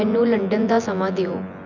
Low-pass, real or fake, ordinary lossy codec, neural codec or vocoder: 7.2 kHz; fake; none; vocoder, 24 kHz, 100 mel bands, Vocos